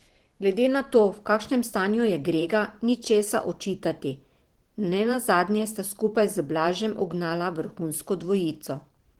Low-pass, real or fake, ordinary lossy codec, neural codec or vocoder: 19.8 kHz; fake; Opus, 16 kbps; vocoder, 44.1 kHz, 128 mel bands every 512 samples, BigVGAN v2